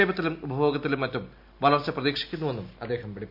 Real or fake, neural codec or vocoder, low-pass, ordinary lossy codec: real; none; 5.4 kHz; MP3, 48 kbps